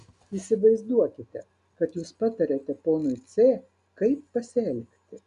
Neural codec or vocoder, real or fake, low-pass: none; real; 10.8 kHz